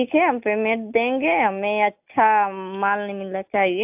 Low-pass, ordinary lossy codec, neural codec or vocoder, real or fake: 3.6 kHz; AAC, 32 kbps; none; real